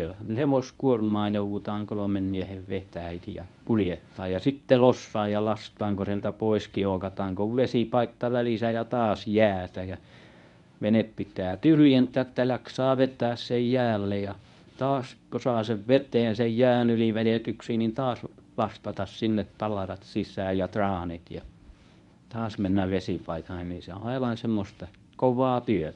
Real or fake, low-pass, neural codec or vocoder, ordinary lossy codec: fake; 10.8 kHz; codec, 24 kHz, 0.9 kbps, WavTokenizer, medium speech release version 1; none